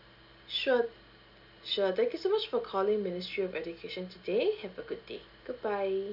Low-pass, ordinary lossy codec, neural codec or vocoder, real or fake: 5.4 kHz; none; none; real